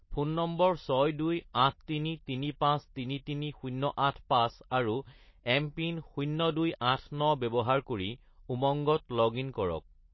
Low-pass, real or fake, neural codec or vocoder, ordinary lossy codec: 7.2 kHz; real; none; MP3, 24 kbps